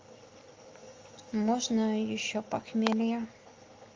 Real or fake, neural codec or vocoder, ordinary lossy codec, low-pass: real; none; Opus, 32 kbps; 7.2 kHz